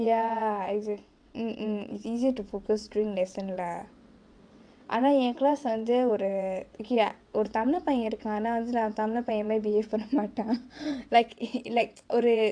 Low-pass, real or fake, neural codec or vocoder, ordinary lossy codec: 9.9 kHz; fake; vocoder, 22.05 kHz, 80 mel bands, WaveNeXt; AAC, 64 kbps